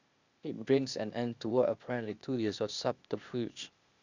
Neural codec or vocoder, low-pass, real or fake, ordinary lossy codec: codec, 16 kHz, 0.8 kbps, ZipCodec; 7.2 kHz; fake; Opus, 64 kbps